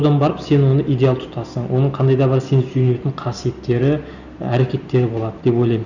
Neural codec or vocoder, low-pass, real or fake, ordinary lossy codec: none; 7.2 kHz; real; none